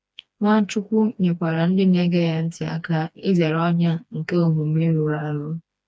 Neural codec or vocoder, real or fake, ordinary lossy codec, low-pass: codec, 16 kHz, 2 kbps, FreqCodec, smaller model; fake; none; none